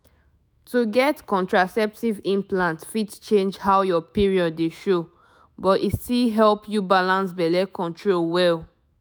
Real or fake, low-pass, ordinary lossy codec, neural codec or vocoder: fake; none; none; autoencoder, 48 kHz, 128 numbers a frame, DAC-VAE, trained on Japanese speech